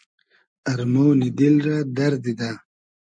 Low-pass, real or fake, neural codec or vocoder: 9.9 kHz; real; none